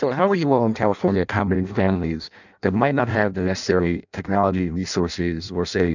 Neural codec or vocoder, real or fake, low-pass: codec, 16 kHz in and 24 kHz out, 0.6 kbps, FireRedTTS-2 codec; fake; 7.2 kHz